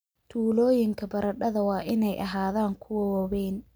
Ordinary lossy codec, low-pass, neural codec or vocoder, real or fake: none; none; none; real